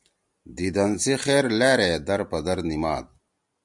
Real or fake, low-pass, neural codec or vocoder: real; 10.8 kHz; none